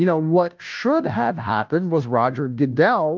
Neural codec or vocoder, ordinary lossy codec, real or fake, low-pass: codec, 16 kHz, 0.5 kbps, FunCodec, trained on Chinese and English, 25 frames a second; Opus, 24 kbps; fake; 7.2 kHz